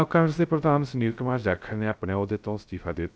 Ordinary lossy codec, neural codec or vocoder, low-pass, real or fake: none; codec, 16 kHz, 0.3 kbps, FocalCodec; none; fake